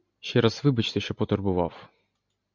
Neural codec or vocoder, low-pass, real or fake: none; 7.2 kHz; real